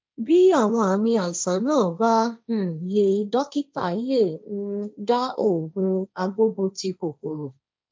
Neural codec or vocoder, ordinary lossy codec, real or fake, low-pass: codec, 16 kHz, 1.1 kbps, Voila-Tokenizer; none; fake; none